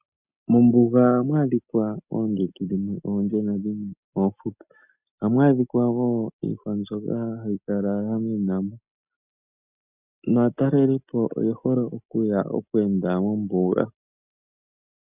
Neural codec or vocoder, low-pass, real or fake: none; 3.6 kHz; real